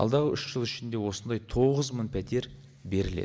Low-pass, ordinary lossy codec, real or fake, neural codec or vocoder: none; none; real; none